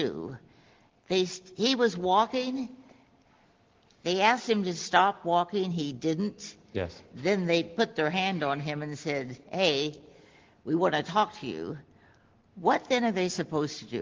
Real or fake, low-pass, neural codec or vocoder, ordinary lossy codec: real; 7.2 kHz; none; Opus, 16 kbps